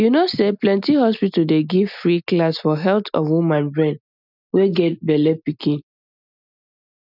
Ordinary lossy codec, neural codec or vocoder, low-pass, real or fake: none; none; 5.4 kHz; real